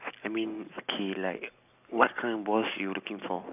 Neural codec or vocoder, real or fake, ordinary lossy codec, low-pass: none; real; none; 3.6 kHz